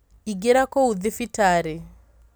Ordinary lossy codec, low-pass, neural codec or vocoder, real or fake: none; none; none; real